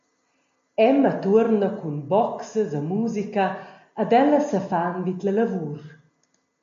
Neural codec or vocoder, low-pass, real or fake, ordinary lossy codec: none; 7.2 kHz; real; MP3, 48 kbps